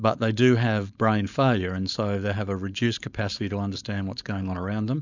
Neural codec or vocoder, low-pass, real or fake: codec, 16 kHz, 4.8 kbps, FACodec; 7.2 kHz; fake